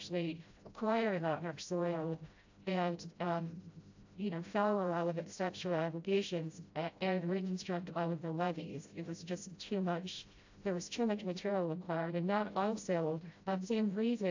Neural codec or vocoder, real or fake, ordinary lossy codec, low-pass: codec, 16 kHz, 0.5 kbps, FreqCodec, smaller model; fake; AAC, 48 kbps; 7.2 kHz